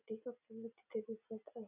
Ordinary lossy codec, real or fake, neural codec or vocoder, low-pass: none; real; none; 3.6 kHz